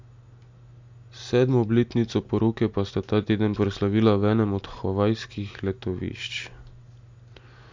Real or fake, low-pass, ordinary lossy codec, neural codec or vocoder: real; 7.2 kHz; MP3, 64 kbps; none